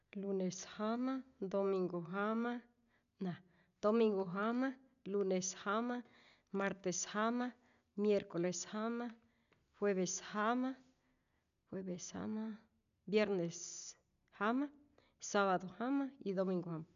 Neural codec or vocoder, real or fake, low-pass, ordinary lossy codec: none; real; 7.2 kHz; none